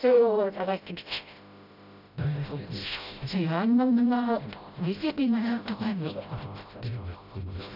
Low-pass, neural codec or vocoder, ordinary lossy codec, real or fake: 5.4 kHz; codec, 16 kHz, 0.5 kbps, FreqCodec, smaller model; none; fake